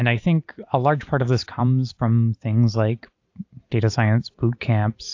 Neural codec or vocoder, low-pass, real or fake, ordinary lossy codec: vocoder, 44.1 kHz, 128 mel bands every 256 samples, BigVGAN v2; 7.2 kHz; fake; AAC, 48 kbps